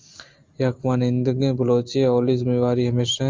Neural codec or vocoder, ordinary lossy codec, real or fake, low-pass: none; Opus, 32 kbps; real; 7.2 kHz